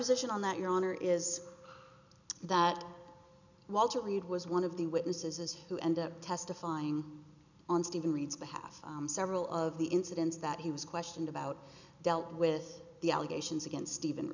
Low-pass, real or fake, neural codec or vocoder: 7.2 kHz; real; none